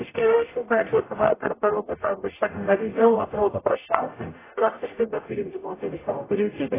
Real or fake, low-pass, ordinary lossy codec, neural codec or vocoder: fake; 3.6 kHz; AAC, 16 kbps; codec, 44.1 kHz, 0.9 kbps, DAC